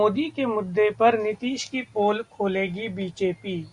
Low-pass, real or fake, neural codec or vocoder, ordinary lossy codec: 10.8 kHz; real; none; Opus, 64 kbps